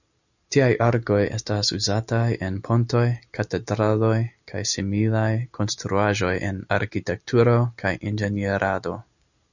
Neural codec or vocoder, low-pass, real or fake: none; 7.2 kHz; real